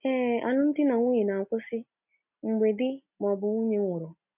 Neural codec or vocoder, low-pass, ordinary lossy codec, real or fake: none; 3.6 kHz; none; real